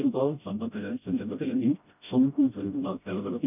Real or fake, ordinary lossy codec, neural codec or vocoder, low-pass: fake; none; codec, 16 kHz, 0.5 kbps, FreqCodec, smaller model; 3.6 kHz